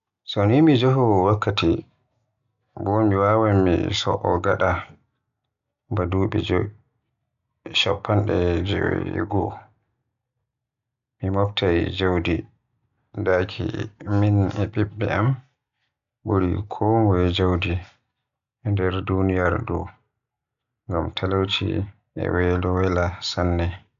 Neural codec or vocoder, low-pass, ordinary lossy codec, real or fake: none; 7.2 kHz; none; real